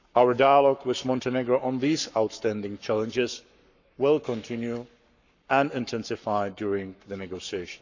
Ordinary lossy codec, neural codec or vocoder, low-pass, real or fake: none; codec, 44.1 kHz, 7.8 kbps, Pupu-Codec; 7.2 kHz; fake